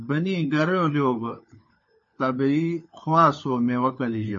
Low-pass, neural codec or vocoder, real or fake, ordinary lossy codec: 7.2 kHz; codec, 16 kHz, 4 kbps, FunCodec, trained on LibriTTS, 50 frames a second; fake; MP3, 32 kbps